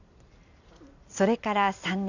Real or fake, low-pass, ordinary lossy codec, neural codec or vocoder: fake; 7.2 kHz; none; vocoder, 44.1 kHz, 128 mel bands every 256 samples, BigVGAN v2